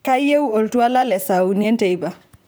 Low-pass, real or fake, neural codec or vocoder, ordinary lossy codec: none; fake; vocoder, 44.1 kHz, 128 mel bands, Pupu-Vocoder; none